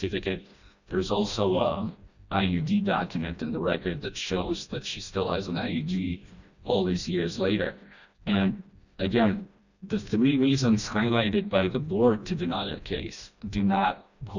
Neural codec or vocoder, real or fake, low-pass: codec, 16 kHz, 1 kbps, FreqCodec, smaller model; fake; 7.2 kHz